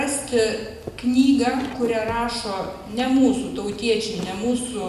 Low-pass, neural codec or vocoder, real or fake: 14.4 kHz; none; real